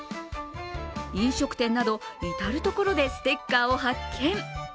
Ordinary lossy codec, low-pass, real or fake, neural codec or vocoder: none; none; real; none